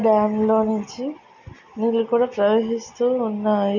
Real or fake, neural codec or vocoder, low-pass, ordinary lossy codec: real; none; 7.2 kHz; none